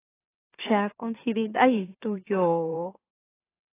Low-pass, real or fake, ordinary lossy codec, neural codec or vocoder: 3.6 kHz; fake; AAC, 16 kbps; autoencoder, 44.1 kHz, a latent of 192 numbers a frame, MeloTTS